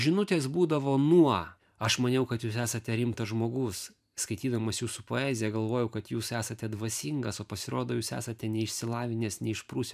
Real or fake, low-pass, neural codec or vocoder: fake; 14.4 kHz; vocoder, 44.1 kHz, 128 mel bands every 512 samples, BigVGAN v2